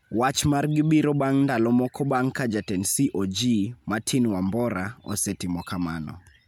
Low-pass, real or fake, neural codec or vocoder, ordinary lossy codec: 19.8 kHz; real; none; MP3, 96 kbps